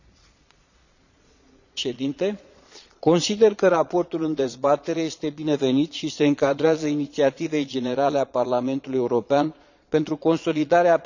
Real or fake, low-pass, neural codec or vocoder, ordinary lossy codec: fake; 7.2 kHz; vocoder, 22.05 kHz, 80 mel bands, Vocos; none